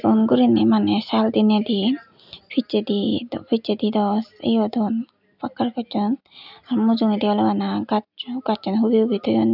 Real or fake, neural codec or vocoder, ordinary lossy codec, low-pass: real; none; none; 5.4 kHz